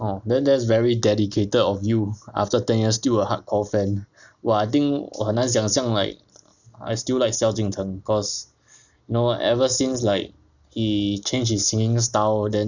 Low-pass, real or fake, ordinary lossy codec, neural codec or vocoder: 7.2 kHz; real; none; none